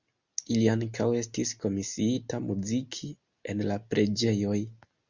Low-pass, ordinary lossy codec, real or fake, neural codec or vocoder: 7.2 kHz; Opus, 64 kbps; real; none